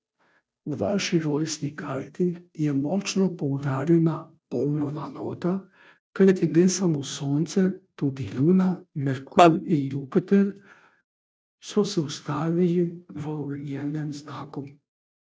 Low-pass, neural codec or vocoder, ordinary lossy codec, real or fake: none; codec, 16 kHz, 0.5 kbps, FunCodec, trained on Chinese and English, 25 frames a second; none; fake